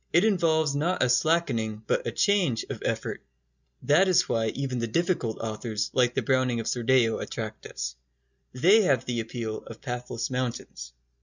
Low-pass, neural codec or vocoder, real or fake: 7.2 kHz; none; real